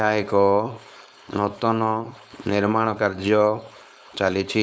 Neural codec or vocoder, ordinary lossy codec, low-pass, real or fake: codec, 16 kHz, 4.8 kbps, FACodec; none; none; fake